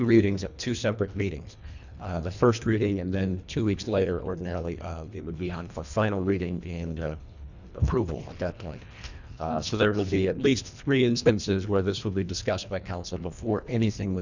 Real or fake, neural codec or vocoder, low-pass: fake; codec, 24 kHz, 1.5 kbps, HILCodec; 7.2 kHz